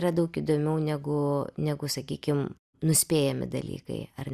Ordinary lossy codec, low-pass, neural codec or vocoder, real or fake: Opus, 64 kbps; 14.4 kHz; none; real